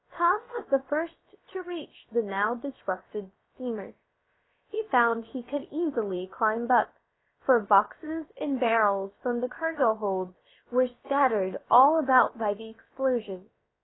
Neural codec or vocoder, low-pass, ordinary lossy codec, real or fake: codec, 16 kHz, about 1 kbps, DyCAST, with the encoder's durations; 7.2 kHz; AAC, 16 kbps; fake